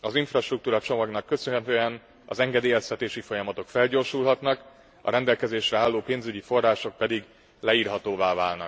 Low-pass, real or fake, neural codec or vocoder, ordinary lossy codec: none; real; none; none